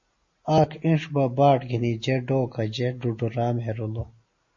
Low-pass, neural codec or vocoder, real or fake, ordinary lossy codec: 7.2 kHz; none; real; MP3, 32 kbps